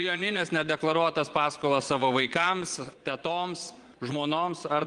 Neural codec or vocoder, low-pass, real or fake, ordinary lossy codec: vocoder, 22.05 kHz, 80 mel bands, Vocos; 9.9 kHz; fake; Opus, 24 kbps